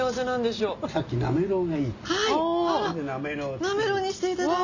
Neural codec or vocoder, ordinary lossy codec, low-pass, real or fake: none; none; 7.2 kHz; real